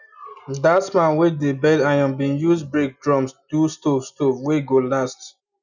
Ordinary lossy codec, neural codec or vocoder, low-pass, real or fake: none; none; 7.2 kHz; real